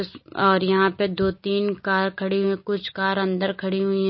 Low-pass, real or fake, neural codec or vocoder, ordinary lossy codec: 7.2 kHz; real; none; MP3, 24 kbps